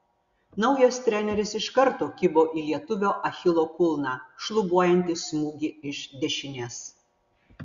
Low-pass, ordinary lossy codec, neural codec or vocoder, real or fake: 7.2 kHz; Opus, 64 kbps; none; real